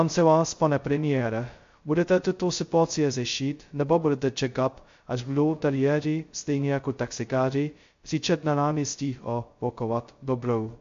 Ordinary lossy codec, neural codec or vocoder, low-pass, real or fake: MP3, 48 kbps; codec, 16 kHz, 0.2 kbps, FocalCodec; 7.2 kHz; fake